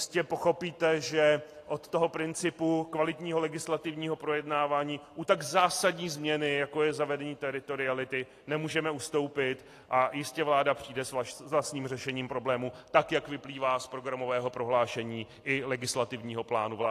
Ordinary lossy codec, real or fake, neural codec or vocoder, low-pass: AAC, 48 kbps; real; none; 14.4 kHz